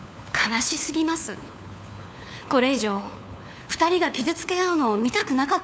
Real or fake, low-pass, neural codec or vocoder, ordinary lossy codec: fake; none; codec, 16 kHz, 4 kbps, FunCodec, trained on LibriTTS, 50 frames a second; none